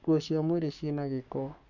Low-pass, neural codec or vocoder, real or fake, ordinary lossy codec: 7.2 kHz; codec, 44.1 kHz, 7.8 kbps, Pupu-Codec; fake; none